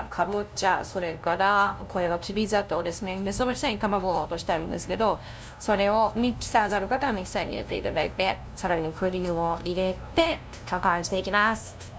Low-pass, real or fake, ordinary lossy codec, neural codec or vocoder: none; fake; none; codec, 16 kHz, 0.5 kbps, FunCodec, trained on LibriTTS, 25 frames a second